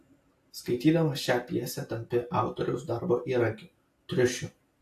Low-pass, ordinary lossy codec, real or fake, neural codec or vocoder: 14.4 kHz; AAC, 64 kbps; real; none